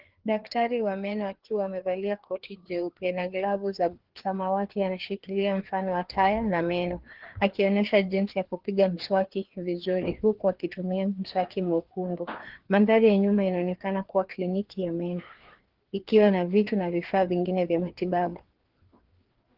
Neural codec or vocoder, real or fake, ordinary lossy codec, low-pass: codec, 16 kHz, 2 kbps, FreqCodec, larger model; fake; Opus, 16 kbps; 5.4 kHz